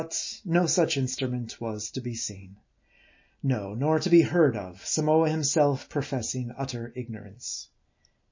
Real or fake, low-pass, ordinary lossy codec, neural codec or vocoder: real; 7.2 kHz; MP3, 32 kbps; none